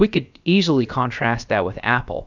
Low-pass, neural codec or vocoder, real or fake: 7.2 kHz; codec, 16 kHz, about 1 kbps, DyCAST, with the encoder's durations; fake